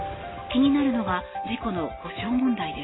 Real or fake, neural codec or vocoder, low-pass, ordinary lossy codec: real; none; 7.2 kHz; AAC, 16 kbps